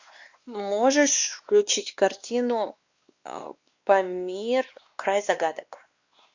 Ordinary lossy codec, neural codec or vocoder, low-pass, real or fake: Opus, 64 kbps; codec, 16 kHz, 2 kbps, X-Codec, WavLM features, trained on Multilingual LibriSpeech; 7.2 kHz; fake